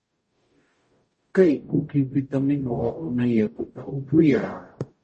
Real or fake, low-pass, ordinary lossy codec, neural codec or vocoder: fake; 10.8 kHz; MP3, 32 kbps; codec, 44.1 kHz, 0.9 kbps, DAC